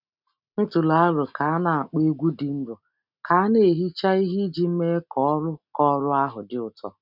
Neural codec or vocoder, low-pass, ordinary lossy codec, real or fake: none; 5.4 kHz; none; real